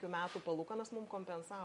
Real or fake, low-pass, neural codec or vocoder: real; 10.8 kHz; none